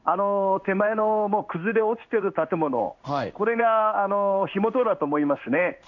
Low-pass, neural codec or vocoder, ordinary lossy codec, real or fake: 7.2 kHz; codec, 16 kHz in and 24 kHz out, 1 kbps, XY-Tokenizer; none; fake